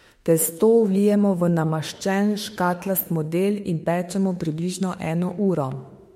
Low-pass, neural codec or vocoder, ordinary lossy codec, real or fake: 19.8 kHz; autoencoder, 48 kHz, 32 numbers a frame, DAC-VAE, trained on Japanese speech; MP3, 64 kbps; fake